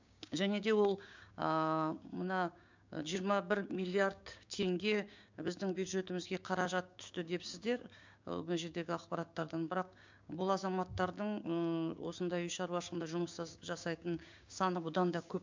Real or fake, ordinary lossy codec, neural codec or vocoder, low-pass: fake; MP3, 64 kbps; codec, 16 kHz, 6 kbps, DAC; 7.2 kHz